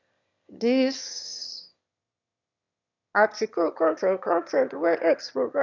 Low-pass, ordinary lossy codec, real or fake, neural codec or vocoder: 7.2 kHz; none; fake; autoencoder, 22.05 kHz, a latent of 192 numbers a frame, VITS, trained on one speaker